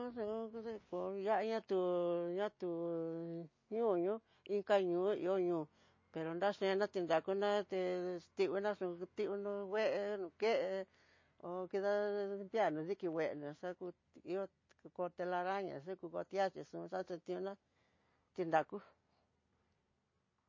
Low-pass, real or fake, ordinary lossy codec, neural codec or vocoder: 7.2 kHz; real; MP3, 32 kbps; none